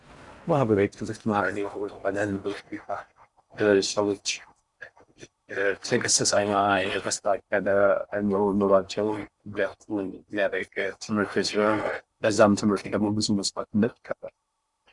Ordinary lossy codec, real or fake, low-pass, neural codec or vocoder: Opus, 64 kbps; fake; 10.8 kHz; codec, 16 kHz in and 24 kHz out, 0.6 kbps, FocalCodec, streaming, 4096 codes